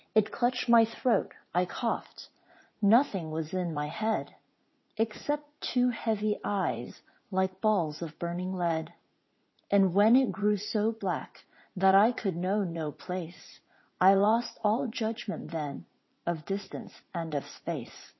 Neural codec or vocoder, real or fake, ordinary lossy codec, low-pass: vocoder, 44.1 kHz, 80 mel bands, Vocos; fake; MP3, 24 kbps; 7.2 kHz